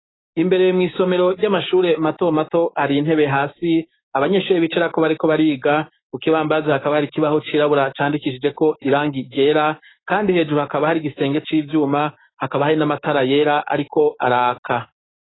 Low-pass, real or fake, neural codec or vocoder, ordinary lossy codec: 7.2 kHz; fake; codec, 16 kHz, 6 kbps, DAC; AAC, 16 kbps